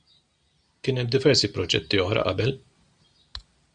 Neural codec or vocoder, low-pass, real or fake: none; 9.9 kHz; real